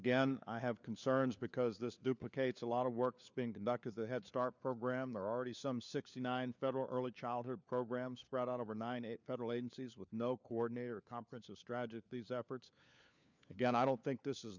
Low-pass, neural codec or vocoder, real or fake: 7.2 kHz; codec, 16 kHz, 4 kbps, FunCodec, trained on LibriTTS, 50 frames a second; fake